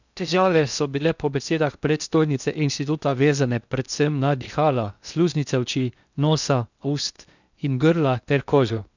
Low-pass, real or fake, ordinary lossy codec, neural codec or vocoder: 7.2 kHz; fake; none; codec, 16 kHz in and 24 kHz out, 0.6 kbps, FocalCodec, streaming, 2048 codes